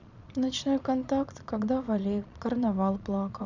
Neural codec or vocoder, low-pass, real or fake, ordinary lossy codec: vocoder, 22.05 kHz, 80 mel bands, Vocos; 7.2 kHz; fake; none